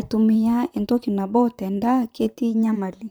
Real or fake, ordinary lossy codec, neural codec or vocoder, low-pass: fake; none; vocoder, 44.1 kHz, 128 mel bands, Pupu-Vocoder; none